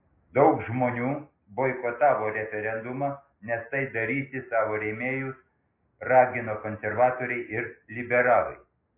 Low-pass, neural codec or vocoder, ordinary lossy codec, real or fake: 3.6 kHz; none; MP3, 24 kbps; real